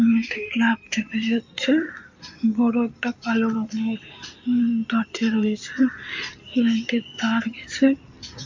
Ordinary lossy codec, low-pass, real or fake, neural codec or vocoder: MP3, 48 kbps; 7.2 kHz; fake; codec, 16 kHz in and 24 kHz out, 2.2 kbps, FireRedTTS-2 codec